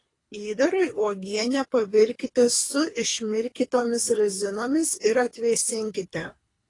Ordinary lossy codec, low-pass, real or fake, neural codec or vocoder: AAC, 32 kbps; 10.8 kHz; fake; codec, 24 kHz, 3 kbps, HILCodec